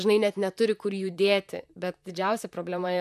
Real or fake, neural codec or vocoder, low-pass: fake; vocoder, 44.1 kHz, 128 mel bands, Pupu-Vocoder; 14.4 kHz